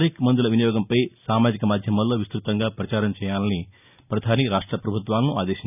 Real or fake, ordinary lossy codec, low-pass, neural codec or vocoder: real; none; 3.6 kHz; none